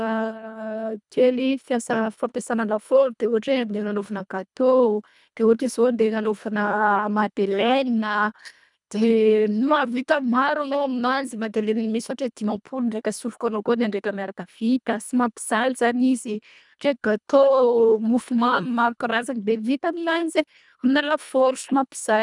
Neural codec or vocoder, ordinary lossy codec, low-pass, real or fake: codec, 24 kHz, 1.5 kbps, HILCodec; none; 10.8 kHz; fake